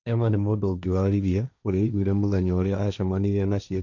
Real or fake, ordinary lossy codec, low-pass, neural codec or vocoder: fake; none; 7.2 kHz; codec, 16 kHz, 1.1 kbps, Voila-Tokenizer